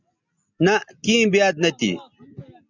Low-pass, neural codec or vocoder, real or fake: 7.2 kHz; none; real